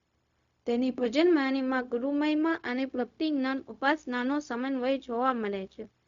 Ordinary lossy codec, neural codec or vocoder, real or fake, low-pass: Opus, 64 kbps; codec, 16 kHz, 0.4 kbps, LongCat-Audio-Codec; fake; 7.2 kHz